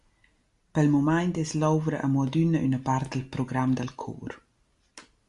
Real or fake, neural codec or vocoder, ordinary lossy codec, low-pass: real; none; Opus, 64 kbps; 10.8 kHz